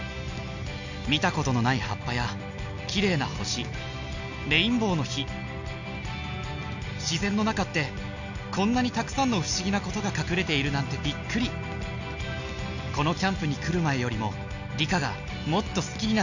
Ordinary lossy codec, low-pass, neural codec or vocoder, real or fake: none; 7.2 kHz; none; real